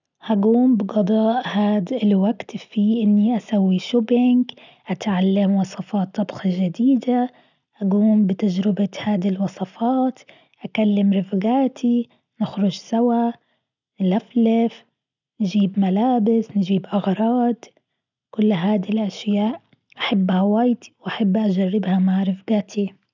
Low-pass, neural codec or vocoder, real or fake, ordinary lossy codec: 7.2 kHz; none; real; none